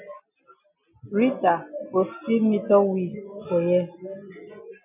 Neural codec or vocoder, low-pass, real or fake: none; 3.6 kHz; real